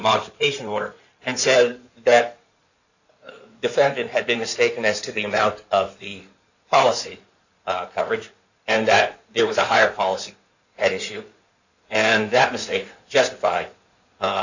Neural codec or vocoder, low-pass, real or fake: codec, 16 kHz in and 24 kHz out, 2.2 kbps, FireRedTTS-2 codec; 7.2 kHz; fake